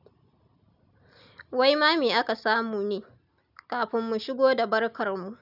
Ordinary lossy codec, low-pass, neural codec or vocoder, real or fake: none; 5.4 kHz; none; real